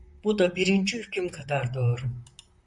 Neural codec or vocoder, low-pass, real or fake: vocoder, 44.1 kHz, 128 mel bands, Pupu-Vocoder; 10.8 kHz; fake